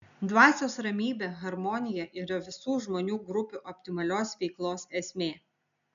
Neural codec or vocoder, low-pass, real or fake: none; 7.2 kHz; real